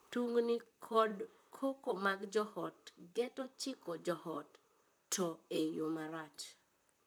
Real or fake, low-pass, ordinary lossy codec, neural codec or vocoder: fake; none; none; vocoder, 44.1 kHz, 128 mel bands, Pupu-Vocoder